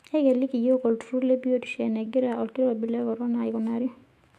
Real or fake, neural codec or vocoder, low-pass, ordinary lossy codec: fake; autoencoder, 48 kHz, 128 numbers a frame, DAC-VAE, trained on Japanese speech; 14.4 kHz; AAC, 64 kbps